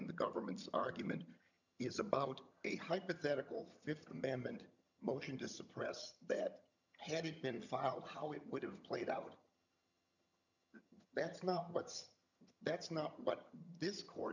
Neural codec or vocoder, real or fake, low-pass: vocoder, 22.05 kHz, 80 mel bands, HiFi-GAN; fake; 7.2 kHz